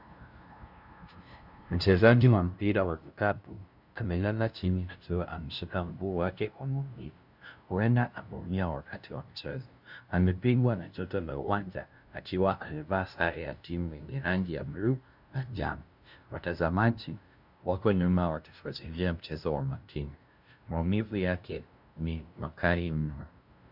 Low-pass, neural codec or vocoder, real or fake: 5.4 kHz; codec, 16 kHz, 0.5 kbps, FunCodec, trained on LibriTTS, 25 frames a second; fake